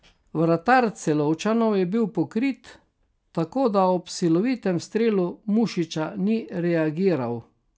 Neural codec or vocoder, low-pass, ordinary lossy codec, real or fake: none; none; none; real